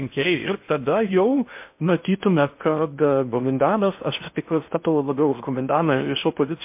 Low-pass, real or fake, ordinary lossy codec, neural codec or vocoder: 3.6 kHz; fake; MP3, 32 kbps; codec, 16 kHz in and 24 kHz out, 0.6 kbps, FocalCodec, streaming, 4096 codes